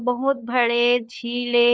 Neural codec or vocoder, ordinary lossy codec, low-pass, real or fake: codec, 16 kHz, 4 kbps, FunCodec, trained on LibriTTS, 50 frames a second; none; none; fake